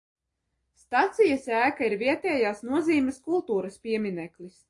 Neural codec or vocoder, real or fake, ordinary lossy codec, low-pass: none; real; AAC, 48 kbps; 10.8 kHz